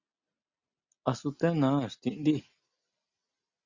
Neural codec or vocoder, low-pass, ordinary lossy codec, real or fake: none; 7.2 kHz; Opus, 64 kbps; real